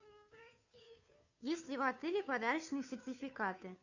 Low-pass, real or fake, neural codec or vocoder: 7.2 kHz; fake; codec, 16 kHz, 2 kbps, FunCodec, trained on Chinese and English, 25 frames a second